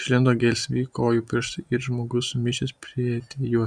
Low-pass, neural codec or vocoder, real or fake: 9.9 kHz; none; real